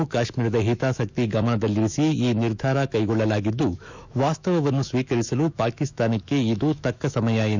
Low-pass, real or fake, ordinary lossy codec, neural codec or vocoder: 7.2 kHz; fake; none; autoencoder, 48 kHz, 128 numbers a frame, DAC-VAE, trained on Japanese speech